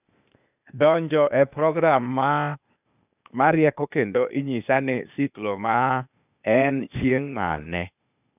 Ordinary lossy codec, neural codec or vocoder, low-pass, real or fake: none; codec, 16 kHz, 0.8 kbps, ZipCodec; 3.6 kHz; fake